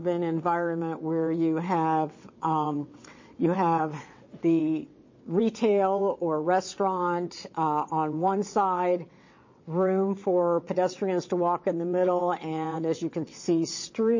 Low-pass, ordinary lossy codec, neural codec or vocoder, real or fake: 7.2 kHz; MP3, 32 kbps; vocoder, 22.05 kHz, 80 mel bands, WaveNeXt; fake